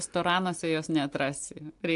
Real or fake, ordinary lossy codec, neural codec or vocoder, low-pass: real; AAC, 64 kbps; none; 10.8 kHz